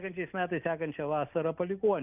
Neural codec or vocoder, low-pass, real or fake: none; 3.6 kHz; real